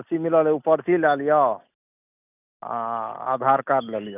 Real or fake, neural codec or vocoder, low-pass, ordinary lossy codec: real; none; 3.6 kHz; none